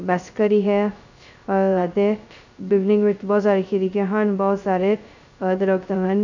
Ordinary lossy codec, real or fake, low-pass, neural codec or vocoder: none; fake; 7.2 kHz; codec, 16 kHz, 0.2 kbps, FocalCodec